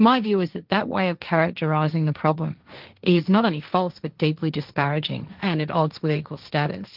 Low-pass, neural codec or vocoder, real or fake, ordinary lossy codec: 5.4 kHz; codec, 16 kHz, 1.1 kbps, Voila-Tokenizer; fake; Opus, 32 kbps